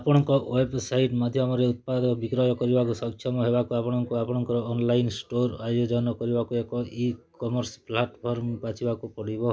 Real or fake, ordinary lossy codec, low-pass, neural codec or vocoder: real; none; none; none